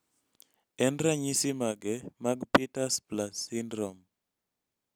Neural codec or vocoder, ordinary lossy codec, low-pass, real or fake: none; none; none; real